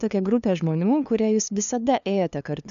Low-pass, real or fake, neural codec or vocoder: 7.2 kHz; fake; codec, 16 kHz, 2 kbps, FunCodec, trained on LibriTTS, 25 frames a second